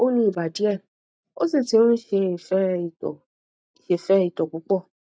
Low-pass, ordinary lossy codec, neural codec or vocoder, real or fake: none; none; none; real